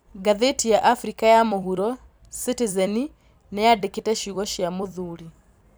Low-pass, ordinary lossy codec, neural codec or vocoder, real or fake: none; none; vocoder, 44.1 kHz, 128 mel bands every 256 samples, BigVGAN v2; fake